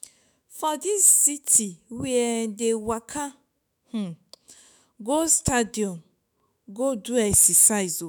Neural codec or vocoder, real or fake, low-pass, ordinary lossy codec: autoencoder, 48 kHz, 128 numbers a frame, DAC-VAE, trained on Japanese speech; fake; none; none